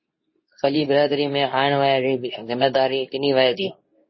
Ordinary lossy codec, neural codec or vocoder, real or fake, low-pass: MP3, 24 kbps; codec, 24 kHz, 0.9 kbps, WavTokenizer, medium speech release version 2; fake; 7.2 kHz